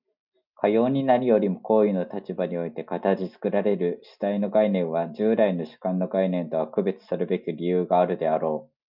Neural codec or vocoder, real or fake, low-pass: none; real; 5.4 kHz